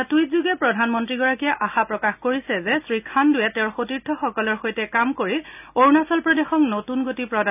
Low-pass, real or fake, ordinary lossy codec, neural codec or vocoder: 3.6 kHz; real; none; none